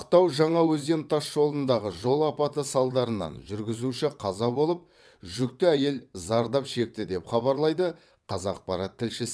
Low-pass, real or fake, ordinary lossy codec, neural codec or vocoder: none; fake; none; vocoder, 22.05 kHz, 80 mel bands, WaveNeXt